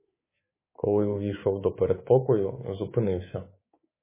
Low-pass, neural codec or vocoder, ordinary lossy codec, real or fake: 3.6 kHz; codec, 24 kHz, 3.1 kbps, DualCodec; MP3, 16 kbps; fake